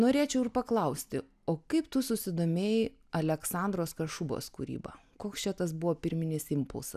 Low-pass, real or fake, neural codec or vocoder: 14.4 kHz; real; none